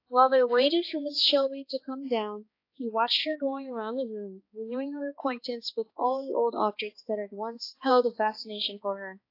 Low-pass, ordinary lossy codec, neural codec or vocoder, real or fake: 5.4 kHz; AAC, 32 kbps; codec, 16 kHz, 2 kbps, X-Codec, HuBERT features, trained on balanced general audio; fake